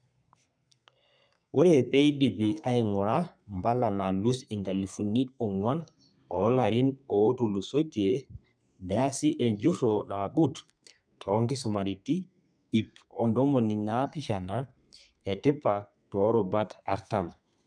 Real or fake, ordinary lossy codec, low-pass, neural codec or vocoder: fake; none; 9.9 kHz; codec, 32 kHz, 1.9 kbps, SNAC